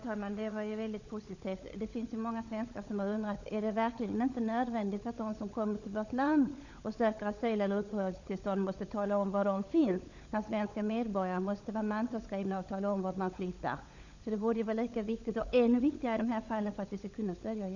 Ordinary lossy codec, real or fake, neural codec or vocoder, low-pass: none; fake; codec, 16 kHz, 16 kbps, FunCodec, trained on LibriTTS, 50 frames a second; 7.2 kHz